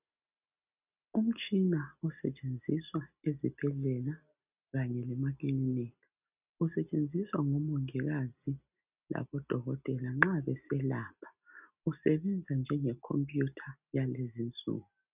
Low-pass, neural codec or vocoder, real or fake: 3.6 kHz; none; real